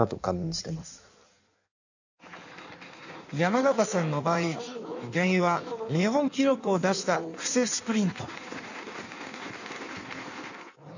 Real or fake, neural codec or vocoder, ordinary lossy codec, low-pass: fake; codec, 16 kHz in and 24 kHz out, 1.1 kbps, FireRedTTS-2 codec; none; 7.2 kHz